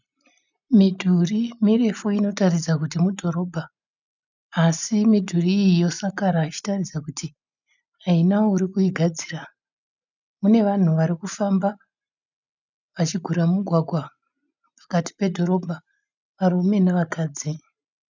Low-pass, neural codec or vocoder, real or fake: 7.2 kHz; none; real